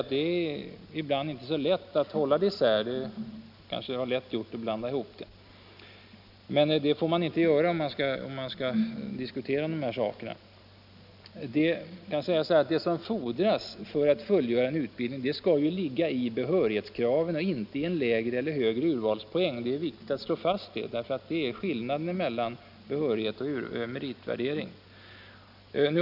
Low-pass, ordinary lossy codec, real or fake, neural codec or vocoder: 5.4 kHz; none; real; none